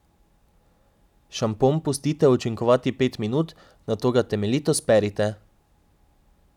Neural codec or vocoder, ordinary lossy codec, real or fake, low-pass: none; none; real; 19.8 kHz